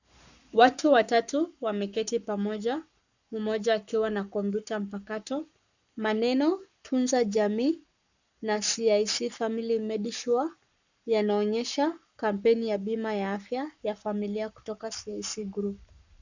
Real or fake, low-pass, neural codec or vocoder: fake; 7.2 kHz; codec, 44.1 kHz, 7.8 kbps, Pupu-Codec